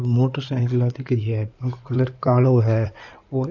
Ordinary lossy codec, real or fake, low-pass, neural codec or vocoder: none; fake; 7.2 kHz; codec, 16 kHz in and 24 kHz out, 2.2 kbps, FireRedTTS-2 codec